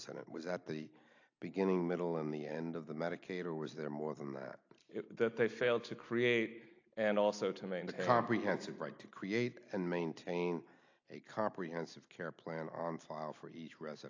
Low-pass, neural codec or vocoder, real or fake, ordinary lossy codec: 7.2 kHz; none; real; AAC, 48 kbps